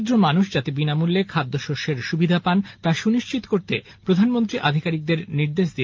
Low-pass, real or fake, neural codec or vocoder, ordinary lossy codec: 7.2 kHz; real; none; Opus, 32 kbps